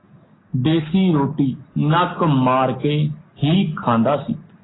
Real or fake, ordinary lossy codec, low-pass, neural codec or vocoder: real; AAC, 16 kbps; 7.2 kHz; none